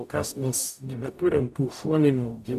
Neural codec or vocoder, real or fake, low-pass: codec, 44.1 kHz, 0.9 kbps, DAC; fake; 14.4 kHz